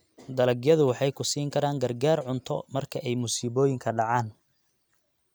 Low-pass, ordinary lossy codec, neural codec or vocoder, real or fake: none; none; none; real